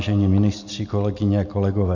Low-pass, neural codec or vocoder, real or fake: 7.2 kHz; none; real